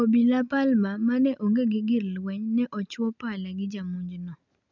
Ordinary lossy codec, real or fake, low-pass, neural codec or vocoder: none; real; 7.2 kHz; none